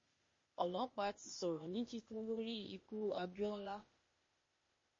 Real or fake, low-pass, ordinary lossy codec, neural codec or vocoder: fake; 7.2 kHz; MP3, 32 kbps; codec, 16 kHz, 0.8 kbps, ZipCodec